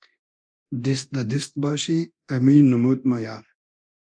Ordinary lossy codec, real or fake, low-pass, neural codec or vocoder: MP3, 64 kbps; fake; 9.9 kHz; codec, 24 kHz, 0.9 kbps, DualCodec